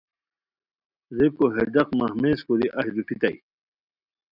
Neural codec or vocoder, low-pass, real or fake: none; 5.4 kHz; real